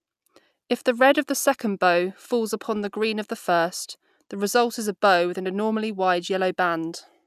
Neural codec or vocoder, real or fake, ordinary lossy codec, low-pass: none; real; none; 14.4 kHz